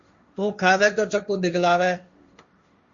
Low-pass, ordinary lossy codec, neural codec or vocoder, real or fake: 7.2 kHz; Opus, 64 kbps; codec, 16 kHz, 1.1 kbps, Voila-Tokenizer; fake